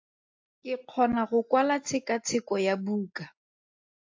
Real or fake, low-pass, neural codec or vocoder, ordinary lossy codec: real; 7.2 kHz; none; AAC, 48 kbps